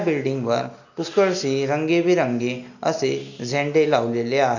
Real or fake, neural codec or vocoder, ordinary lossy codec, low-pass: real; none; none; 7.2 kHz